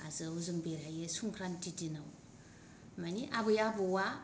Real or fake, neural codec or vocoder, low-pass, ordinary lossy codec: real; none; none; none